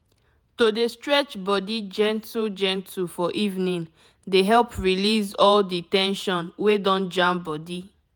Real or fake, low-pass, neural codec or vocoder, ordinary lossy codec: fake; none; vocoder, 48 kHz, 128 mel bands, Vocos; none